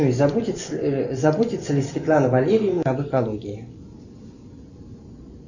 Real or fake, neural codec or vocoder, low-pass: fake; vocoder, 44.1 kHz, 128 mel bands every 512 samples, BigVGAN v2; 7.2 kHz